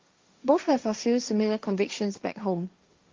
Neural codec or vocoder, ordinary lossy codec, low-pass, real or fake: codec, 16 kHz, 1.1 kbps, Voila-Tokenizer; Opus, 32 kbps; 7.2 kHz; fake